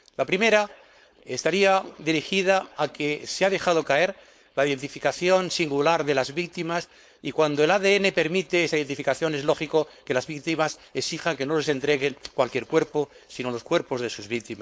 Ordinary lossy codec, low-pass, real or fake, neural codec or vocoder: none; none; fake; codec, 16 kHz, 4.8 kbps, FACodec